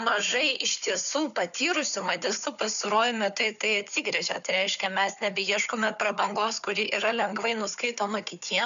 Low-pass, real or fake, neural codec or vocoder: 7.2 kHz; fake; codec, 16 kHz, 4 kbps, FunCodec, trained on Chinese and English, 50 frames a second